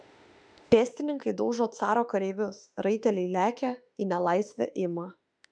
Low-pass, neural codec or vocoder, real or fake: 9.9 kHz; autoencoder, 48 kHz, 32 numbers a frame, DAC-VAE, trained on Japanese speech; fake